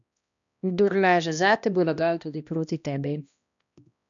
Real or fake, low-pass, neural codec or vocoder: fake; 7.2 kHz; codec, 16 kHz, 1 kbps, X-Codec, HuBERT features, trained on balanced general audio